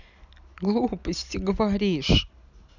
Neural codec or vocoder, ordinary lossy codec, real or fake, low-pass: none; none; real; 7.2 kHz